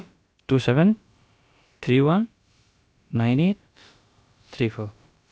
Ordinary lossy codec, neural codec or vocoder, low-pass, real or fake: none; codec, 16 kHz, about 1 kbps, DyCAST, with the encoder's durations; none; fake